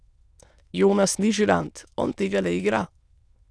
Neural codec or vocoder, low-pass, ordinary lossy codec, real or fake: autoencoder, 22.05 kHz, a latent of 192 numbers a frame, VITS, trained on many speakers; none; none; fake